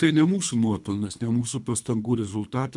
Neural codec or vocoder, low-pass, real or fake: codec, 24 kHz, 3 kbps, HILCodec; 10.8 kHz; fake